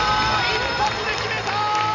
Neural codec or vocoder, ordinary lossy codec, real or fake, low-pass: none; none; real; 7.2 kHz